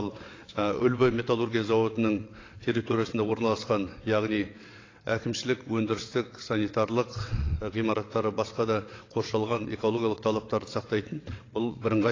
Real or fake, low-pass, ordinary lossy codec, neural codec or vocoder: fake; 7.2 kHz; AAC, 32 kbps; vocoder, 22.05 kHz, 80 mel bands, WaveNeXt